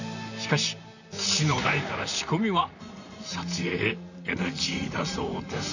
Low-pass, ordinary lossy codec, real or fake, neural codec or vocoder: 7.2 kHz; none; fake; vocoder, 44.1 kHz, 128 mel bands, Pupu-Vocoder